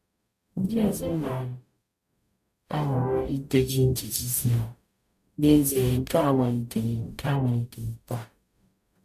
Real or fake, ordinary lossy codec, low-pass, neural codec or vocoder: fake; none; 14.4 kHz; codec, 44.1 kHz, 0.9 kbps, DAC